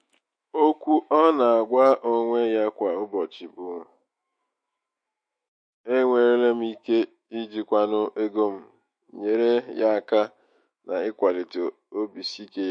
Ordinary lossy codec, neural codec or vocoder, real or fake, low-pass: MP3, 48 kbps; autoencoder, 48 kHz, 128 numbers a frame, DAC-VAE, trained on Japanese speech; fake; 9.9 kHz